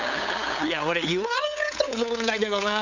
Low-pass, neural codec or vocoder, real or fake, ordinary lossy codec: 7.2 kHz; codec, 16 kHz, 8 kbps, FunCodec, trained on LibriTTS, 25 frames a second; fake; none